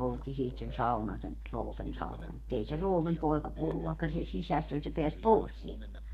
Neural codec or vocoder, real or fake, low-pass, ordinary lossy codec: codec, 32 kHz, 1.9 kbps, SNAC; fake; 14.4 kHz; Opus, 64 kbps